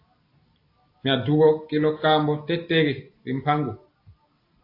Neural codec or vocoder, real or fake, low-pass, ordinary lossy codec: none; real; 5.4 kHz; AAC, 32 kbps